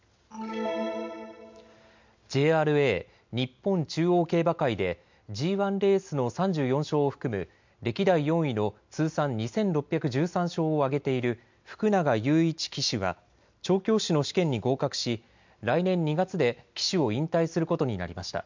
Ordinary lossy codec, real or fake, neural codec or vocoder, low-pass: MP3, 64 kbps; real; none; 7.2 kHz